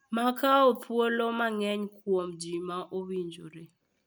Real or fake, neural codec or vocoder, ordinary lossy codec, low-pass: real; none; none; none